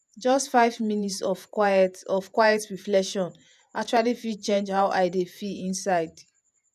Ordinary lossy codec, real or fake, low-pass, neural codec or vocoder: none; fake; 14.4 kHz; vocoder, 48 kHz, 128 mel bands, Vocos